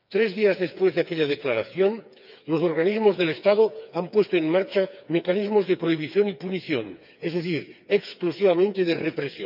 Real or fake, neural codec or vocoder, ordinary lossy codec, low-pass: fake; codec, 16 kHz, 4 kbps, FreqCodec, smaller model; none; 5.4 kHz